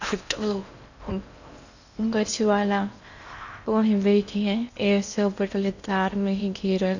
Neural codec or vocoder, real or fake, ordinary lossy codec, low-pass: codec, 16 kHz in and 24 kHz out, 0.6 kbps, FocalCodec, streaming, 4096 codes; fake; none; 7.2 kHz